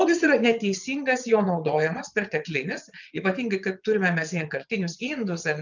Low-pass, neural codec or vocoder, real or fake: 7.2 kHz; vocoder, 22.05 kHz, 80 mel bands, WaveNeXt; fake